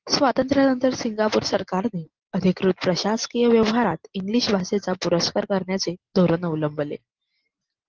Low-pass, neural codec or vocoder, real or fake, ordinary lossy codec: 7.2 kHz; none; real; Opus, 16 kbps